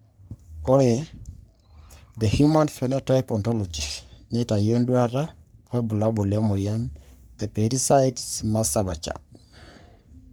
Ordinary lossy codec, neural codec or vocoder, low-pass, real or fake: none; codec, 44.1 kHz, 3.4 kbps, Pupu-Codec; none; fake